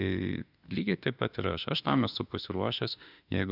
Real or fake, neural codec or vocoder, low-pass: fake; autoencoder, 48 kHz, 32 numbers a frame, DAC-VAE, trained on Japanese speech; 5.4 kHz